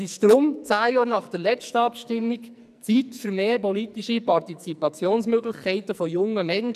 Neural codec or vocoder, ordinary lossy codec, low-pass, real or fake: codec, 44.1 kHz, 2.6 kbps, SNAC; none; 14.4 kHz; fake